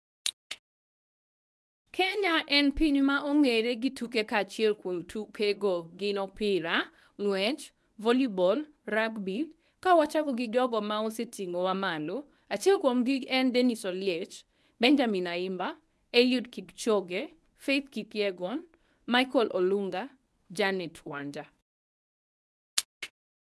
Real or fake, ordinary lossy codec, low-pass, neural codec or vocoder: fake; none; none; codec, 24 kHz, 0.9 kbps, WavTokenizer, medium speech release version 1